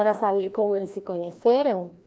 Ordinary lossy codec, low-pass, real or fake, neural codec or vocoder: none; none; fake; codec, 16 kHz, 1 kbps, FreqCodec, larger model